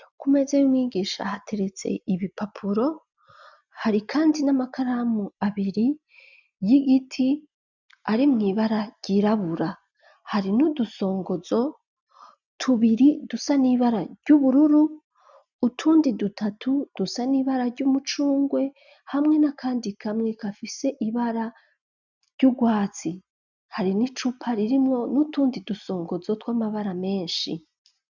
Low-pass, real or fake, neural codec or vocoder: 7.2 kHz; real; none